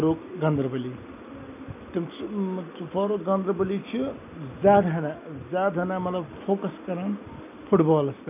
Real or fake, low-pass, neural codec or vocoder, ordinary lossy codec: real; 3.6 kHz; none; none